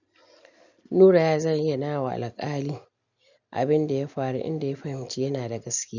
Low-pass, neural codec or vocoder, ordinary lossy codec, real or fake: 7.2 kHz; none; none; real